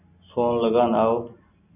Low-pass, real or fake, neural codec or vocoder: 3.6 kHz; real; none